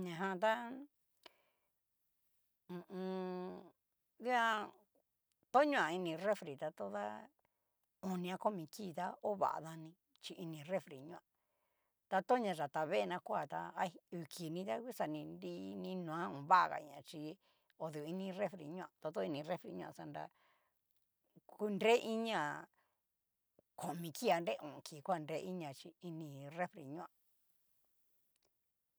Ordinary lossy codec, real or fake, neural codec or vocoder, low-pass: none; real; none; none